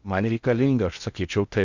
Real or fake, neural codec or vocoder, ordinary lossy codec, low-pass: fake; codec, 16 kHz in and 24 kHz out, 0.6 kbps, FocalCodec, streaming, 2048 codes; Opus, 64 kbps; 7.2 kHz